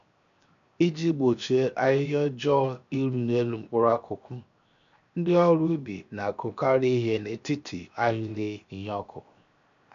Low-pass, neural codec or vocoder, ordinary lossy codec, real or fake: 7.2 kHz; codec, 16 kHz, 0.7 kbps, FocalCodec; none; fake